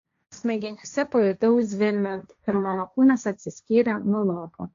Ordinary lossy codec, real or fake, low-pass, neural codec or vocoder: AAC, 48 kbps; fake; 7.2 kHz; codec, 16 kHz, 1.1 kbps, Voila-Tokenizer